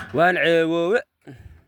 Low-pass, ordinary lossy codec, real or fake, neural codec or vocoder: 19.8 kHz; none; real; none